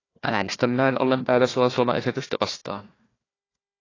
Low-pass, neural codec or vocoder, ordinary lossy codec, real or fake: 7.2 kHz; codec, 16 kHz, 1 kbps, FunCodec, trained on Chinese and English, 50 frames a second; AAC, 32 kbps; fake